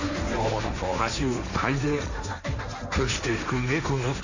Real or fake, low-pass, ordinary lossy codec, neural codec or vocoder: fake; 7.2 kHz; none; codec, 16 kHz, 1.1 kbps, Voila-Tokenizer